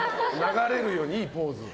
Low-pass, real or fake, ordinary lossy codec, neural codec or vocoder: none; real; none; none